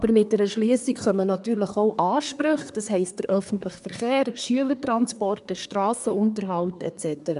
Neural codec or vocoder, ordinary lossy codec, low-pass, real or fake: codec, 24 kHz, 1 kbps, SNAC; none; 10.8 kHz; fake